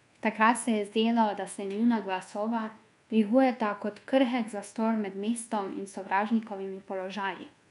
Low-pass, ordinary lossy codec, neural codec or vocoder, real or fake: 10.8 kHz; none; codec, 24 kHz, 1.2 kbps, DualCodec; fake